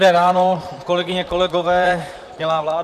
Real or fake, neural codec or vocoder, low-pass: fake; vocoder, 44.1 kHz, 128 mel bands, Pupu-Vocoder; 14.4 kHz